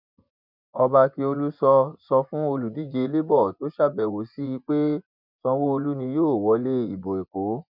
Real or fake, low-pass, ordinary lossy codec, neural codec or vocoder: fake; 5.4 kHz; none; vocoder, 24 kHz, 100 mel bands, Vocos